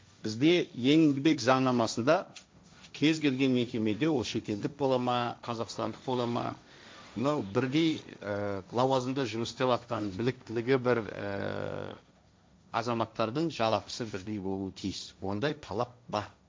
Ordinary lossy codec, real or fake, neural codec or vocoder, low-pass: none; fake; codec, 16 kHz, 1.1 kbps, Voila-Tokenizer; none